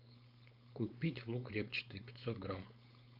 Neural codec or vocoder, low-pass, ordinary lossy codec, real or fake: codec, 16 kHz, 4.8 kbps, FACodec; 5.4 kHz; AAC, 48 kbps; fake